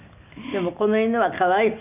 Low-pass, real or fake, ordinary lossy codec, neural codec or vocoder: 3.6 kHz; real; none; none